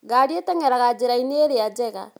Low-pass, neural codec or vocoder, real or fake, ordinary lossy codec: none; none; real; none